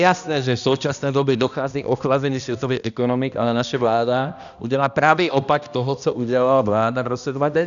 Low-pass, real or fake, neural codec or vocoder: 7.2 kHz; fake; codec, 16 kHz, 1 kbps, X-Codec, HuBERT features, trained on balanced general audio